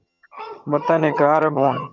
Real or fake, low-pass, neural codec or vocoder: fake; 7.2 kHz; vocoder, 22.05 kHz, 80 mel bands, HiFi-GAN